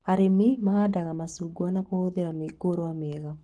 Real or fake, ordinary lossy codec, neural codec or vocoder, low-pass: fake; Opus, 16 kbps; codec, 44.1 kHz, 7.8 kbps, Pupu-Codec; 10.8 kHz